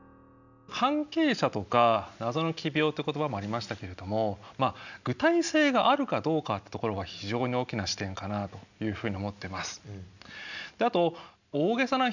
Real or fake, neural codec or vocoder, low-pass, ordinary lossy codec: real; none; 7.2 kHz; none